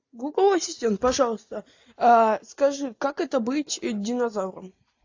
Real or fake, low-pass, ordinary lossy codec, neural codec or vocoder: real; 7.2 kHz; AAC, 48 kbps; none